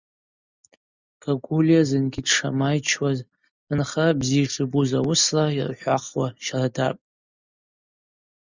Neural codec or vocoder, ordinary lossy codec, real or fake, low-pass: none; Opus, 64 kbps; real; 7.2 kHz